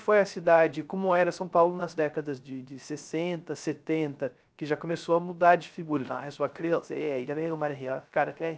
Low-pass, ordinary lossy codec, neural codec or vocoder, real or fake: none; none; codec, 16 kHz, 0.3 kbps, FocalCodec; fake